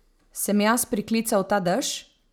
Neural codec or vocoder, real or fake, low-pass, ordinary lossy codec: none; real; none; none